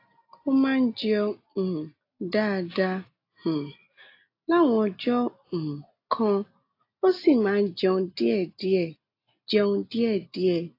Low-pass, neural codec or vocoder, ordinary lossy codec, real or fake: 5.4 kHz; none; AAC, 32 kbps; real